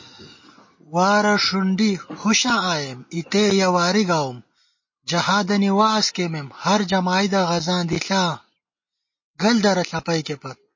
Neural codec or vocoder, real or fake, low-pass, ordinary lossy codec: none; real; 7.2 kHz; MP3, 32 kbps